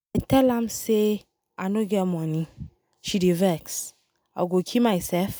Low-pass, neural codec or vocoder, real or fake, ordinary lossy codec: none; none; real; none